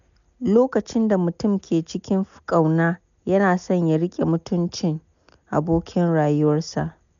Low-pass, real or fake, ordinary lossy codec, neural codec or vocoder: 7.2 kHz; real; MP3, 96 kbps; none